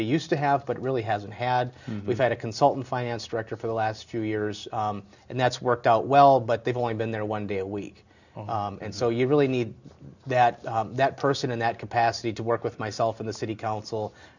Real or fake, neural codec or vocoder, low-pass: real; none; 7.2 kHz